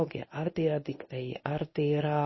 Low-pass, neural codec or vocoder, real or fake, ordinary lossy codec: 7.2 kHz; codec, 24 kHz, 0.9 kbps, WavTokenizer, medium speech release version 1; fake; MP3, 24 kbps